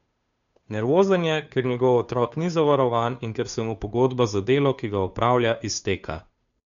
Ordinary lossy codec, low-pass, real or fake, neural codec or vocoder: none; 7.2 kHz; fake; codec, 16 kHz, 2 kbps, FunCodec, trained on Chinese and English, 25 frames a second